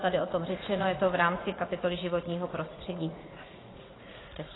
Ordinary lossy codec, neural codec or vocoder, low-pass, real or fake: AAC, 16 kbps; vocoder, 44.1 kHz, 80 mel bands, Vocos; 7.2 kHz; fake